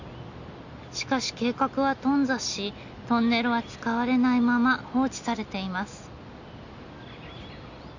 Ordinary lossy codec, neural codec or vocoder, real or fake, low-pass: none; none; real; 7.2 kHz